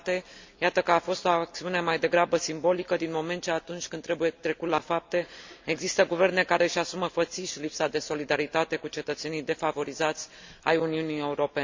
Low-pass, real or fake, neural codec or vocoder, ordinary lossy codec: 7.2 kHz; real; none; MP3, 64 kbps